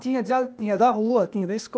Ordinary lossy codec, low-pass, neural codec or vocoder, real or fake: none; none; codec, 16 kHz, 0.8 kbps, ZipCodec; fake